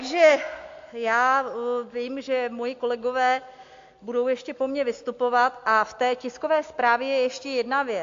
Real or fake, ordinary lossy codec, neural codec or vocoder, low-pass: real; AAC, 48 kbps; none; 7.2 kHz